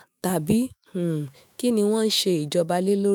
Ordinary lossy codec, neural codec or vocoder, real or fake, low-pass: none; autoencoder, 48 kHz, 128 numbers a frame, DAC-VAE, trained on Japanese speech; fake; none